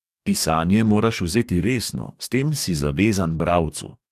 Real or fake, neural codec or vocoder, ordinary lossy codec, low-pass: fake; codec, 44.1 kHz, 2.6 kbps, DAC; none; 14.4 kHz